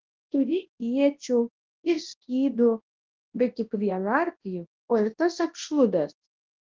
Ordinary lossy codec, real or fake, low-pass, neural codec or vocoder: Opus, 16 kbps; fake; 7.2 kHz; codec, 24 kHz, 0.9 kbps, WavTokenizer, large speech release